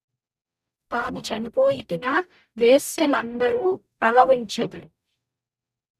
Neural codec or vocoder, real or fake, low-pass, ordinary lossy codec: codec, 44.1 kHz, 0.9 kbps, DAC; fake; 14.4 kHz; none